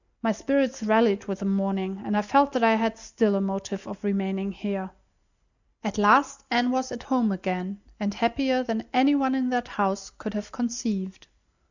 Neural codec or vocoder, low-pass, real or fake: none; 7.2 kHz; real